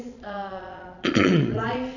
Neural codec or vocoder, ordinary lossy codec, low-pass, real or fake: vocoder, 22.05 kHz, 80 mel bands, Vocos; none; 7.2 kHz; fake